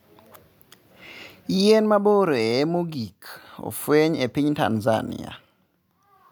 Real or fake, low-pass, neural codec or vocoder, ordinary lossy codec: real; none; none; none